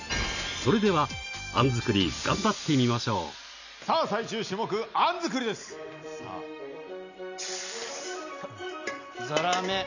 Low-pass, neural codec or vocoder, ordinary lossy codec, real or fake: 7.2 kHz; none; none; real